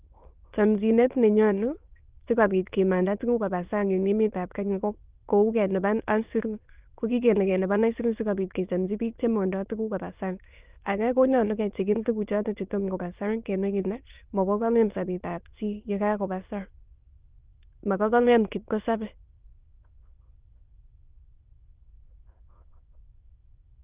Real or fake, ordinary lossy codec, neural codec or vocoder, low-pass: fake; Opus, 24 kbps; autoencoder, 22.05 kHz, a latent of 192 numbers a frame, VITS, trained on many speakers; 3.6 kHz